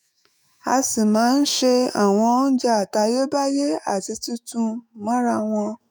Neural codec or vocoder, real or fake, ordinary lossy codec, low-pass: autoencoder, 48 kHz, 128 numbers a frame, DAC-VAE, trained on Japanese speech; fake; none; none